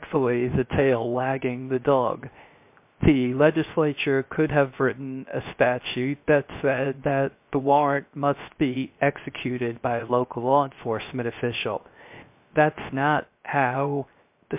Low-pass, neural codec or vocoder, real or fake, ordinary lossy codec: 3.6 kHz; codec, 16 kHz, 0.3 kbps, FocalCodec; fake; MP3, 32 kbps